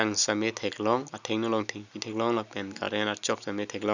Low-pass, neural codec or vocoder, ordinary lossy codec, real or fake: 7.2 kHz; none; none; real